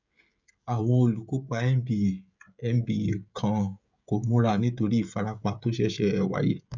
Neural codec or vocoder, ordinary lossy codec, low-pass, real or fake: codec, 16 kHz, 16 kbps, FreqCodec, smaller model; none; 7.2 kHz; fake